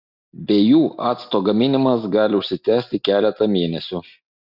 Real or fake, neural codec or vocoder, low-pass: real; none; 5.4 kHz